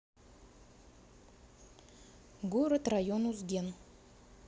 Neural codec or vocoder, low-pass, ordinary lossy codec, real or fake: none; none; none; real